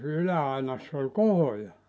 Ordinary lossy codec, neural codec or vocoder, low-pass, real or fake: none; none; none; real